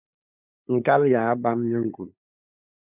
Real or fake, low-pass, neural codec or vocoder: fake; 3.6 kHz; codec, 16 kHz, 8 kbps, FunCodec, trained on LibriTTS, 25 frames a second